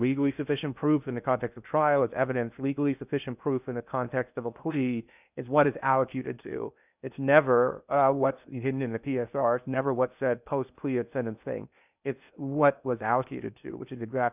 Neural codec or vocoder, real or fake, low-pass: codec, 16 kHz in and 24 kHz out, 0.6 kbps, FocalCodec, streaming, 2048 codes; fake; 3.6 kHz